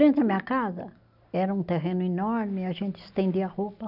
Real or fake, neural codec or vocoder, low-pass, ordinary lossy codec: real; none; 5.4 kHz; none